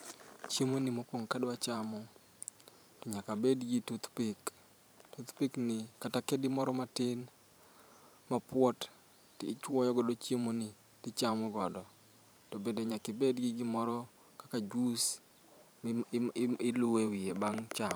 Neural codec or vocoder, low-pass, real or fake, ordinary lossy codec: vocoder, 44.1 kHz, 128 mel bands every 512 samples, BigVGAN v2; none; fake; none